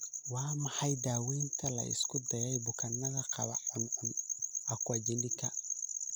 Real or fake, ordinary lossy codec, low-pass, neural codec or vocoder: real; none; none; none